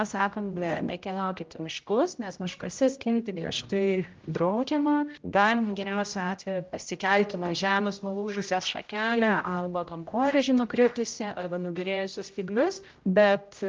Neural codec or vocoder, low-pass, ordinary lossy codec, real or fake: codec, 16 kHz, 0.5 kbps, X-Codec, HuBERT features, trained on general audio; 7.2 kHz; Opus, 24 kbps; fake